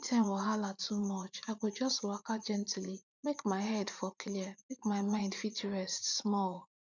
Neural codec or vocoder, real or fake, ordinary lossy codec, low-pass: vocoder, 44.1 kHz, 80 mel bands, Vocos; fake; none; 7.2 kHz